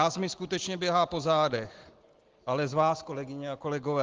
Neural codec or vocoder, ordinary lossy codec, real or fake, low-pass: none; Opus, 16 kbps; real; 7.2 kHz